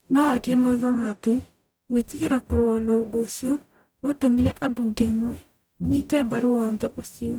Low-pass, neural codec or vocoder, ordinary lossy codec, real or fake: none; codec, 44.1 kHz, 0.9 kbps, DAC; none; fake